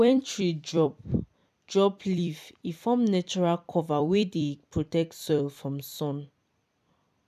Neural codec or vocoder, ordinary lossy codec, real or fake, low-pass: vocoder, 44.1 kHz, 128 mel bands every 256 samples, BigVGAN v2; none; fake; 14.4 kHz